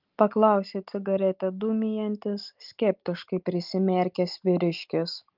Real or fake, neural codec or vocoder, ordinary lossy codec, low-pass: real; none; Opus, 32 kbps; 5.4 kHz